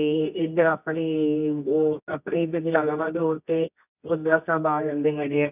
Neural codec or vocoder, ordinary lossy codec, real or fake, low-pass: codec, 24 kHz, 0.9 kbps, WavTokenizer, medium music audio release; none; fake; 3.6 kHz